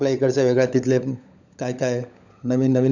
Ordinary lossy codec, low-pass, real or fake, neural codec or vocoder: none; 7.2 kHz; fake; codec, 16 kHz, 16 kbps, FunCodec, trained on LibriTTS, 50 frames a second